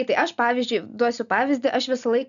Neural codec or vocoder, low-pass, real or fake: none; 7.2 kHz; real